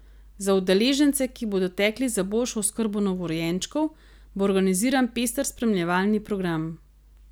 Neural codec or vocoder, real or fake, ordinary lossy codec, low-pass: none; real; none; none